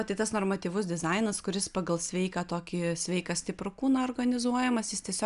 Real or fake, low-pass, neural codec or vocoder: real; 10.8 kHz; none